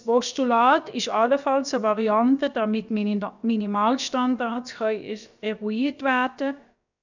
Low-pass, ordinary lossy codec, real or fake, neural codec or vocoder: 7.2 kHz; none; fake; codec, 16 kHz, about 1 kbps, DyCAST, with the encoder's durations